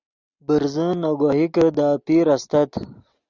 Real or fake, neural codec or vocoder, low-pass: real; none; 7.2 kHz